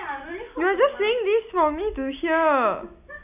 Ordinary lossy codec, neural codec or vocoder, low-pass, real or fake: none; none; 3.6 kHz; real